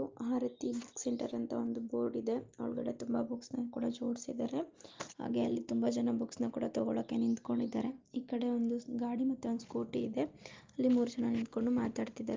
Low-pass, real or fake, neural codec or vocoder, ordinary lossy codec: 7.2 kHz; real; none; Opus, 32 kbps